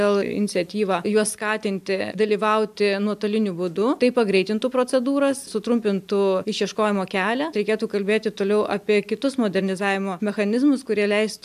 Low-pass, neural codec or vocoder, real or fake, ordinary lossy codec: 14.4 kHz; none; real; AAC, 96 kbps